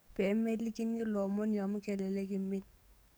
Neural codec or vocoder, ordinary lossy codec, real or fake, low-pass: codec, 44.1 kHz, 7.8 kbps, DAC; none; fake; none